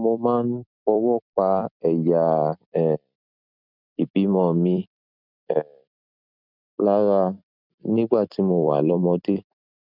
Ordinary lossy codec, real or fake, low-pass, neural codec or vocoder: none; fake; 5.4 kHz; vocoder, 44.1 kHz, 128 mel bands every 256 samples, BigVGAN v2